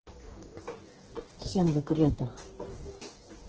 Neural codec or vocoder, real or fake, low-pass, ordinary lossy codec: codec, 44.1 kHz, 2.6 kbps, DAC; fake; 7.2 kHz; Opus, 16 kbps